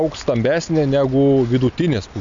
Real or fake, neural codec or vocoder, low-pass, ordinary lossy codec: real; none; 7.2 kHz; MP3, 64 kbps